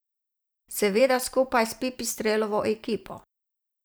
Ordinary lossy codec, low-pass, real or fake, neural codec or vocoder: none; none; fake; vocoder, 44.1 kHz, 128 mel bands every 512 samples, BigVGAN v2